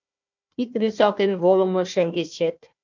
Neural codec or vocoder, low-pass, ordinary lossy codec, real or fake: codec, 16 kHz, 1 kbps, FunCodec, trained on Chinese and English, 50 frames a second; 7.2 kHz; MP3, 64 kbps; fake